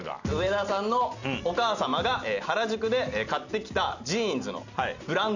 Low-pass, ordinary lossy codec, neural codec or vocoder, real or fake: 7.2 kHz; none; none; real